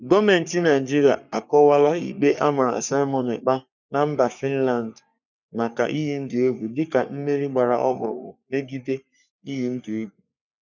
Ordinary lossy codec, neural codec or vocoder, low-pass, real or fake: none; codec, 44.1 kHz, 3.4 kbps, Pupu-Codec; 7.2 kHz; fake